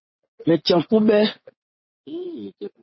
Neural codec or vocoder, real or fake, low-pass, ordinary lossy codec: vocoder, 24 kHz, 100 mel bands, Vocos; fake; 7.2 kHz; MP3, 24 kbps